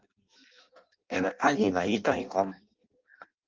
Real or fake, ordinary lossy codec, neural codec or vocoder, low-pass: fake; Opus, 32 kbps; codec, 16 kHz in and 24 kHz out, 0.6 kbps, FireRedTTS-2 codec; 7.2 kHz